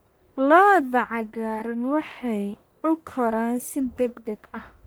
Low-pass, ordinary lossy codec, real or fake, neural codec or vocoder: none; none; fake; codec, 44.1 kHz, 1.7 kbps, Pupu-Codec